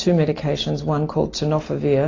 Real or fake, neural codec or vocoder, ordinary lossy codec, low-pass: real; none; AAC, 32 kbps; 7.2 kHz